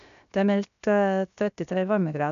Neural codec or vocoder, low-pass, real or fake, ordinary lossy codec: codec, 16 kHz, 0.7 kbps, FocalCodec; 7.2 kHz; fake; none